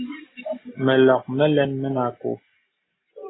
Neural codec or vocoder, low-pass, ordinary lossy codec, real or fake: none; 7.2 kHz; AAC, 16 kbps; real